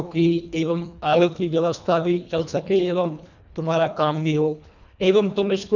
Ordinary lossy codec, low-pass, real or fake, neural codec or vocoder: none; 7.2 kHz; fake; codec, 24 kHz, 1.5 kbps, HILCodec